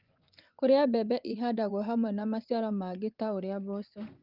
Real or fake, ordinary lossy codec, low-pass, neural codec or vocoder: fake; Opus, 24 kbps; 5.4 kHz; vocoder, 24 kHz, 100 mel bands, Vocos